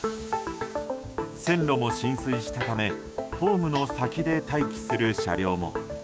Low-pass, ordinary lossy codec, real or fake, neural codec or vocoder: none; none; fake; codec, 16 kHz, 6 kbps, DAC